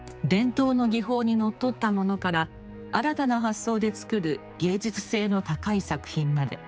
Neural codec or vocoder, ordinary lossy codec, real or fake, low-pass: codec, 16 kHz, 4 kbps, X-Codec, HuBERT features, trained on general audio; none; fake; none